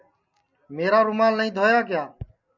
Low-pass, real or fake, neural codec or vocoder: 7.2 kHz; real; none